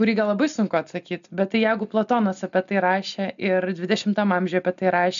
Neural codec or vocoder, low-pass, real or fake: none; 7.2 kHz; real